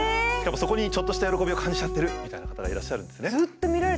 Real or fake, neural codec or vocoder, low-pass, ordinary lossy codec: real; none; none; none